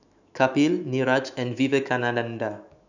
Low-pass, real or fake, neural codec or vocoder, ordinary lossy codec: 7.2 kHz; fake; autoencoder, 48 kHz, 128 numbers a frame, DAC-VAE, trained on Japanese speech; none